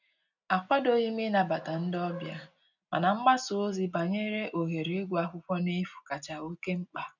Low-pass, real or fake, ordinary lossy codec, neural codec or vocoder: 7.2 kHz; real; none; none